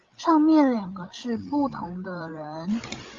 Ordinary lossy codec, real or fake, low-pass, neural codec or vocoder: Opus, 32 kbps; fake; 7.2 kHz; codec, 16 kHz, 16 kbps, FreqCodec, larger model